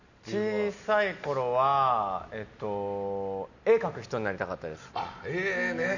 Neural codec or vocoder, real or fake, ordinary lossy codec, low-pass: none; real; none; 7.2 kHz